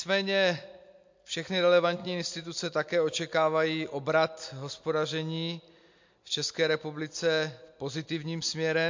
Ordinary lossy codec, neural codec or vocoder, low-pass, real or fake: MP3, 48 kbps; none; 7.2 kHz; real